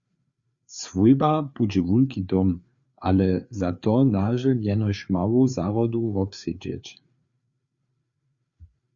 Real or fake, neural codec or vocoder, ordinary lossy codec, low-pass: fake; codec, 16 kHz, 4 kbps, FreqCodec, larger model; Opus, 64 kbps; 7.2 kHz